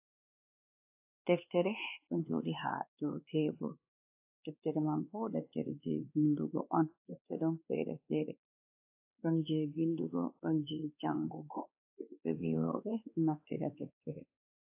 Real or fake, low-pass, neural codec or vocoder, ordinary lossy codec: fake; 3.6 kHz; codec, 16 kHz, 2 kbps, X-Codec, WavLM features, trained on Multilingual LibriSpeech; AAC, 32 kbps